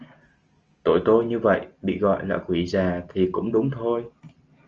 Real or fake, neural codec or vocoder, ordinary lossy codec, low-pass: real; none; Opus, 24 kbps; 7.2 kHz